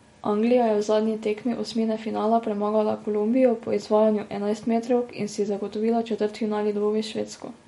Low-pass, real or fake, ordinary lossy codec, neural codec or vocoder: 19.8 kHz; real; MP3, 48 kbps; none